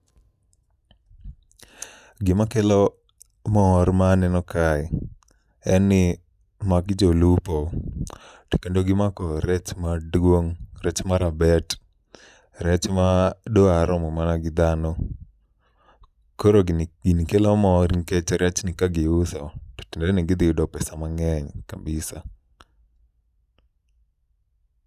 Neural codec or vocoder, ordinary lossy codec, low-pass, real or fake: none; none; 14.4 kHz; real